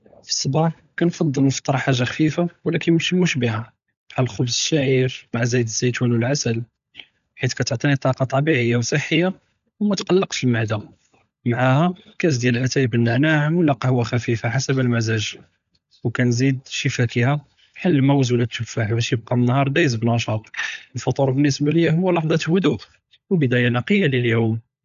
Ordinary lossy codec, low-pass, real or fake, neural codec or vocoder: none; 7.2 kHz; fake; codec, 16 kHz, 16 kbps, FunCodec, trained on LibriTTS, 50 frames a second